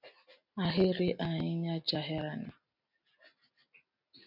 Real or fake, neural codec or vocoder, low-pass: real; none; 5.4 kHz